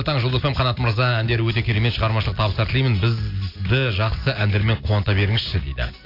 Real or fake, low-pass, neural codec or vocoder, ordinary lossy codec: real; 5.4 kHz; none; AAC, 32 kbps